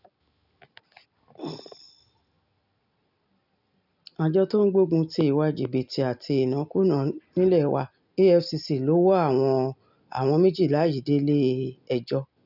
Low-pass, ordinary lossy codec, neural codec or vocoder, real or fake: 5.4 kHz; none; none; real